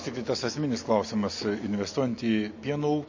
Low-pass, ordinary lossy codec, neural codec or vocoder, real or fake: 7.2 kHz; MP3, 32 kbps; none; real